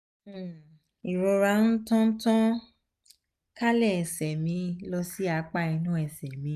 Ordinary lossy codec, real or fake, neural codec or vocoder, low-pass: none; real; none; 14.4 kHz